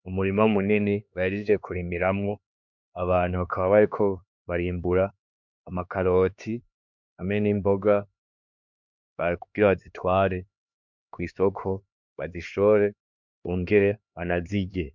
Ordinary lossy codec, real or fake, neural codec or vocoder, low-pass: MP3, 64 kbps; fake; codec, 16 kHz, 2 kbps, X-Codec, HuBERT features, trained on LibriSpeech; 7.2 kHz